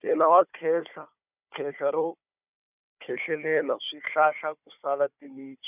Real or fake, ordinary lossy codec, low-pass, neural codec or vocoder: fake; none; 3.6 kHz; codec, 16 kHz, 4 kbps, FunCodec, trained on Chinese and English, 50 frames a second